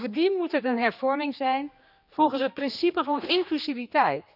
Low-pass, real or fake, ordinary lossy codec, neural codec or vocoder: 5.4 kHz; fake; none; codec, 16 kHz, 2 kbps, X-Codec, HuBERT features, trained on general audio